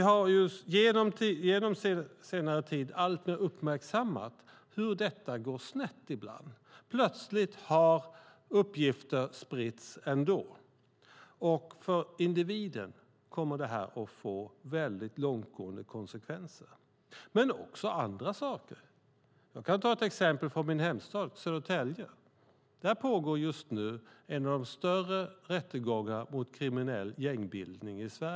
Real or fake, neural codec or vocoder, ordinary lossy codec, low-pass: real; none; none; none